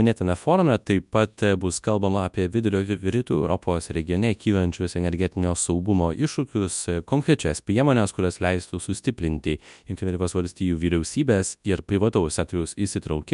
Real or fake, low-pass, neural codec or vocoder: fake; 10.8 kHz; codec, 24 kHz, 0.9 kbps, WavTokenizer, large speech release